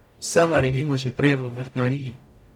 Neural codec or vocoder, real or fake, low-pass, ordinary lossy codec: codec, 44.1 kHz, 0.9 kbps, DAC; fake; 19.8 kHz; none